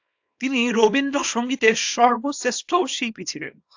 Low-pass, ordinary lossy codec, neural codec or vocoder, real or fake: 7.2 kHz; AAC, 48 kbps; codec, 24 kHz, 0.9 kbps, WavTokenizer, small release; fake